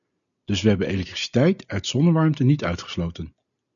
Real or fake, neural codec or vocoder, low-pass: real; none; 7.2 kHz